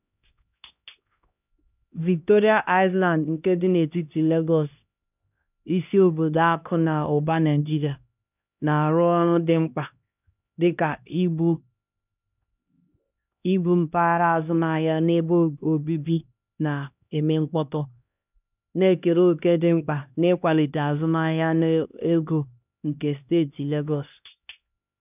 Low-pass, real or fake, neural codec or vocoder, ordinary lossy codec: 3.6 kHz; fake; codec, 16 kHz, 1 kbps, X-Codec, HuBERT features, trained on LibriSpeech; none